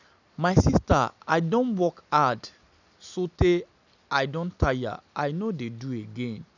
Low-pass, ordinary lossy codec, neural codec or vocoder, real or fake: 7.2 kHz; none; none; real